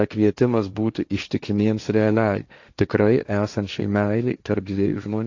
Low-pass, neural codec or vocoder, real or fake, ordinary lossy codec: 7.2 kHz; codec, 16 kHz, 1.1 kbps, Voila-Tokenizer; fake; AAC, 48 kbps